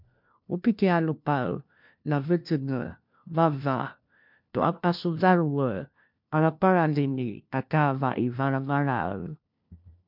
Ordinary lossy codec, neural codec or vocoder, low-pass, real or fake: MP3, 48 kbps; codec, 16 kHz, 1 kbps, FunCodec, trained on LibriTTS, 50 frames a second; 5.4 kHz; fake